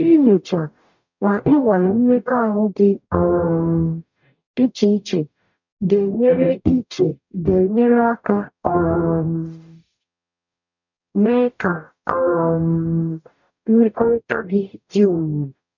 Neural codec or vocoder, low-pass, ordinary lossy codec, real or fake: codec, 44.1 kHz, 0.9 kbps, DAC; 7.2 kHz; none; fake